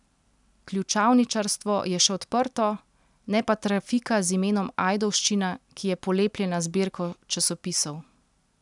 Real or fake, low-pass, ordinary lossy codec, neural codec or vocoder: real; 10.8 kHz; none; none